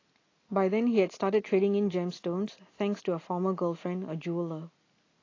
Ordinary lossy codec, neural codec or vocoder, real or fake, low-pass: AAC, 32 kbps; none; real; 7.2 kHz